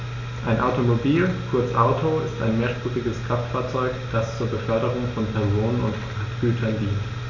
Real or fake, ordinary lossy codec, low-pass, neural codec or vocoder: real; none; 7.2 kHz; none